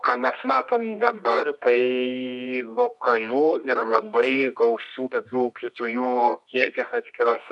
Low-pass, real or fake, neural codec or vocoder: 10.8 kHz; fake; codec, 24 kHz, 0.9 kbps, WavTokenizer, medium music audio release